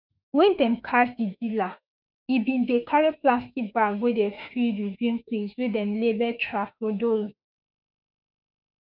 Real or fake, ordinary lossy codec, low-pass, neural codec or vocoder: fake; none; 5.4 kHz; autoencoder, 48 kHz, 32 numbers a frame, DAC-VAE, trained on Japanese speech